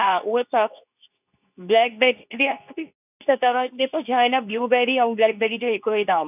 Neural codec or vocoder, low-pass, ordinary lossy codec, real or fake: codec, 24 kHz, 0.9 kbps, WavTokenizer, medium speech release version 2; 3.6 kHz; none; fake